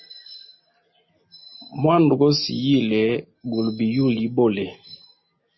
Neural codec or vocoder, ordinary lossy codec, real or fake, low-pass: none; MP3, 24 kbps; real; 7.2 kHz